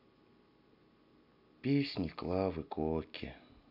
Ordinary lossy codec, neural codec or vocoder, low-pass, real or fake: MP3, 48 kbps; none; 5.4 kHz; real